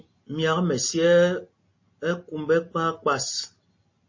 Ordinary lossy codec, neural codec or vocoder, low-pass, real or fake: MP3, 32 kbps; none; 7.2 kHz; real